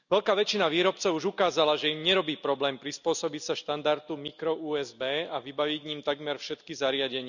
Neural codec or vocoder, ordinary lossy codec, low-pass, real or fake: none; none; 7.2 kHz; real